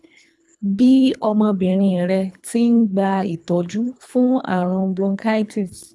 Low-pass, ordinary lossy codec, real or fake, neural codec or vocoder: none; none; fake; codec, 24 kHz, 3 kbps, HILCodec